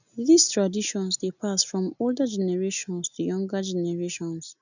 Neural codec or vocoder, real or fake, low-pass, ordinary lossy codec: none; real; 7.2 kHz; none